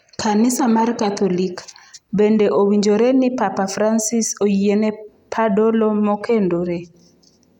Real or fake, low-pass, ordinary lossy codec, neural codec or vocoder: real; 19.8 kHz; none; none